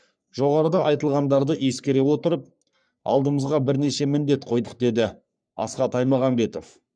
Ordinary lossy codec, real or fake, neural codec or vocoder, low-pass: none; fake; codec, 44.1 kHz, 3.4 kbps, Pupu-Codec; 9.9 kHz